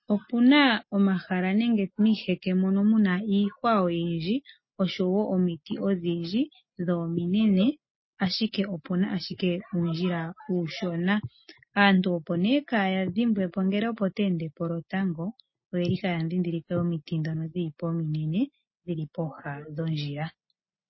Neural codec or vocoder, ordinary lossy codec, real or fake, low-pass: none; MP3, 24 kbps; real; 7.2 kHz